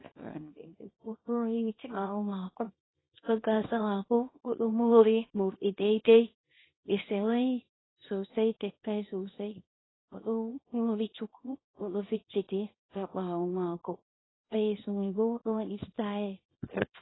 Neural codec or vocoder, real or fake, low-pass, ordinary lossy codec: codec, 24 kHz, 0.9 kbps, WavTokenizer, small release; fake; 7.2 kHz; AAC, 16 kbps